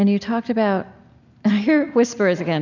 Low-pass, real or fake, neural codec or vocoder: 7.2 kHz; real; none